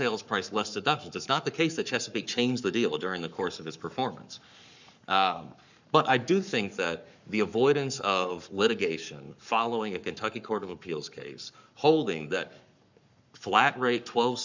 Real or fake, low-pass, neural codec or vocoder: fake; 7.2 kHz; codec, 44.1 kHz, 7.8 kbps, Pupu-Codec